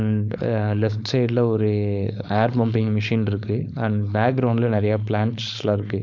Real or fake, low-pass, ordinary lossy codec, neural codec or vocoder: fake; 7.2 kHz; none; codec, 16 kHz, 4.8 kbps, FACodec